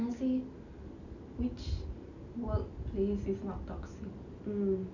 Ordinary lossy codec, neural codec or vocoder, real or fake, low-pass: none; none; real; 7.2 kHz